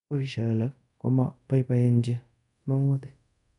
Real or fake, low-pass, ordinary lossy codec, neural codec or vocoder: fake; 10.8 kHz; none; codec, 24 kHz, 0.5 kbps, DualCodec